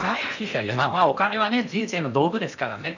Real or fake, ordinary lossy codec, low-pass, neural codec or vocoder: fake; none; 7.2 kHz; codec, 16 kHz in and 24 kHz out, 0.8 kbps, FocalCodec, streaming, 65536 codes